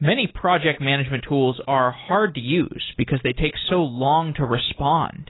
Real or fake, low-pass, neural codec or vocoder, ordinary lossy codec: real; 7.2 kHz; none; AAC, 16 kbps